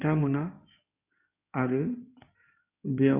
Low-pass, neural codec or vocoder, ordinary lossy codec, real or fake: 3.6 kHz; codec, 16 kHz in and 24 kHz out, 2.2 kbps, FireRedTTS-2 codec; none; fake